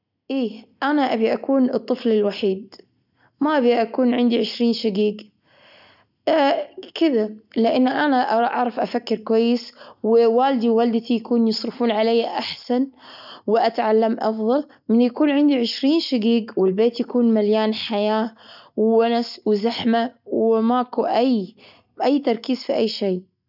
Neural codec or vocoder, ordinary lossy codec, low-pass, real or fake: none; none; 5.4 kHz; real